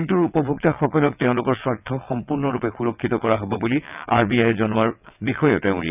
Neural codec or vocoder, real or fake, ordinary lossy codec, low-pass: vocoder, 22.05 kHz, 80 mel bands, WaveNeXt; fake; none; 3.6 kHz